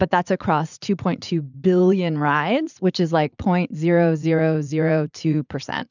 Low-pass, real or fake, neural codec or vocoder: 7.2 kHz; fake; vocoder, 44.1 kHz, 80 mel bands, Vocos